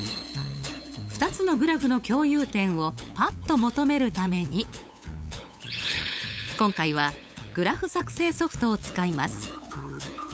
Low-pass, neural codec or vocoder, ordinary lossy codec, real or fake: none; codec, 16 kHz, 8 kbps, FunCodec, trained on LibriTTS, 25 frames a second; none; fake